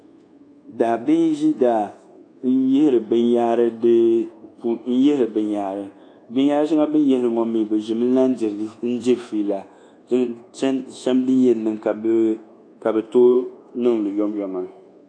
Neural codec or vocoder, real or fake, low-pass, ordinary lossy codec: codec, 24 kHz, 1.2 kbps, DualCodec; fake; 9.9 kHz; AAC, 48 kbps